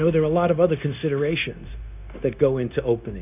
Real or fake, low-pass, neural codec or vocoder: fake; 3.6 kHz; codec, 16 kHz, 0.9 kbps, LongCat-Audio-Codec